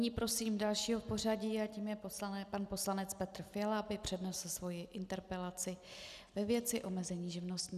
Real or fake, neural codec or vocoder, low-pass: real; none; 14.4 kHz